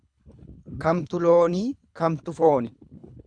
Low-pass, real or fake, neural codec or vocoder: 9.9 kHz; fake; codec, 24 kHz, 3 kbps, HILCodec